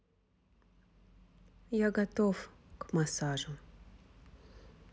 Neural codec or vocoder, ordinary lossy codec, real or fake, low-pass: none; none; real; none